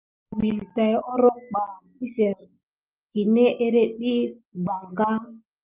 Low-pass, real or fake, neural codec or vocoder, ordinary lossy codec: 3.6 kHz; real; none; Opus, 24 kbps